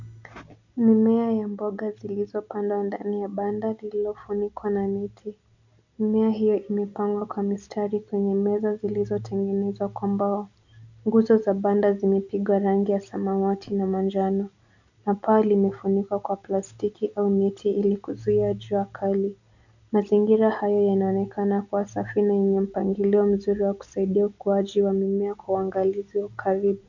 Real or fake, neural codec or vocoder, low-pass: real; none; 7.2 kHz